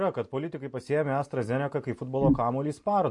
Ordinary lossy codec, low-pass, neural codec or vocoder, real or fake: MP3, 48 kbps; 10.8 kHz; none; real